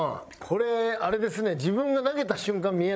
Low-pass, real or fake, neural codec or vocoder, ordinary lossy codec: none; fake; codec, 16 kHz, 16 kbps, FreqCodec, larger model; none